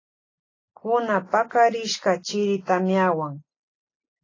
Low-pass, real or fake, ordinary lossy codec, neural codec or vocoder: 7.2 kHz; real; AAC, 32 kbps; none